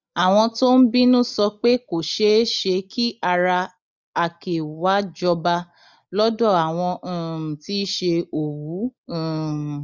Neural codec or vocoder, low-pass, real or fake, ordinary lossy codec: none; 7.2 kHz; real; none